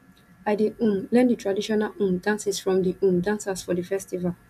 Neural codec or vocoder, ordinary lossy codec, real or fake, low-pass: none; none; real; 14.4 kHz